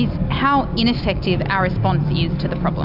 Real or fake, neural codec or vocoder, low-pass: real; none; 5.4 kHz